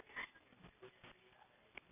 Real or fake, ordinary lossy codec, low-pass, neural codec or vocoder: real; none; 3.6 kHz; none